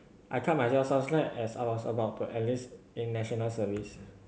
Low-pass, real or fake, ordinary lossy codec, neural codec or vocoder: none; real; none; none